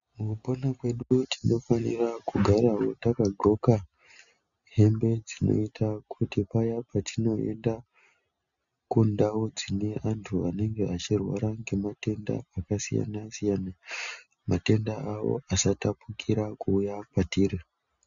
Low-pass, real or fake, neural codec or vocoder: 7.2 kHz; real; none